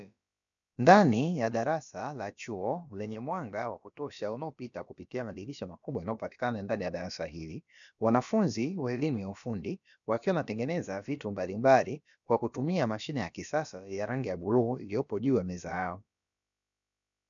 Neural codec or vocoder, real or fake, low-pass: codec, 16 kHz, about 1 kbps, DyCAST, with the encoder's durations; fake; 7.2 kHz